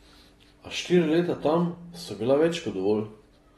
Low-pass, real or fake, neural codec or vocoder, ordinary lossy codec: 19.8 kHz; real; none; AAC, 32 kbps